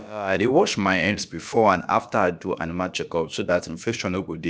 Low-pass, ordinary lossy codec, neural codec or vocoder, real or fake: none; none; codec, 16 kHz, about 1 kbps, DyCAST, with the encoder's durations; fake